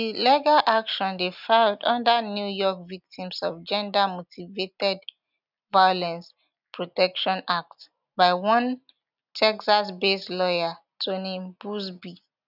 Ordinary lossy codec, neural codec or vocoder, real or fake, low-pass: none; none; real; 5.4 kHz